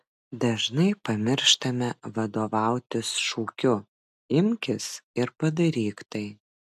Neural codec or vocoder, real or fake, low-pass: none; real; 14.4 kHz